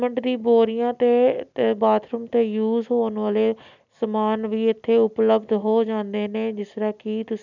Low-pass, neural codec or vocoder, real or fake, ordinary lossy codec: 7.2 kHz; none; real; none